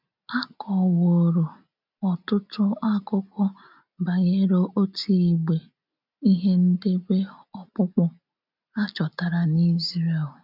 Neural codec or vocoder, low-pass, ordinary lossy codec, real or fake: none; 5.4 kHz; none; real